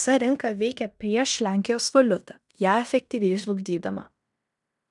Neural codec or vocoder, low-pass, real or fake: codec, 16 kHz in and 24 kHz out, 0.9 kbps, LongCat-Audio-Codec, fine tuned four codebook decoder; 10.8 kHz; fake